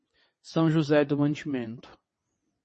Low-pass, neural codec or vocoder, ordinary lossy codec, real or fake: 9.9 kHz; vocoder, 22.05 kHz, 80 mel bands, WaveNeXt; MP3, 32 kbps; fake